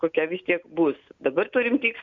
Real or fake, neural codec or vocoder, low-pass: real; none; 7.2 kHz